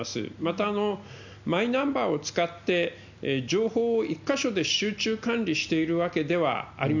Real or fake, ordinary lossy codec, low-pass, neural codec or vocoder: real; none; 7.2 kHz; none